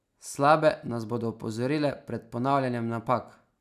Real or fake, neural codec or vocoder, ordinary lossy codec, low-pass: real; none; none; 14.4 kHz